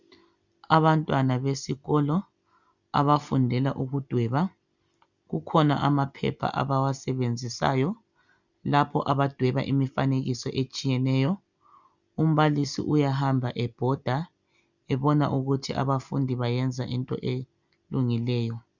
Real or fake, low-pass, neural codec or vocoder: real; 7.2 kHz; none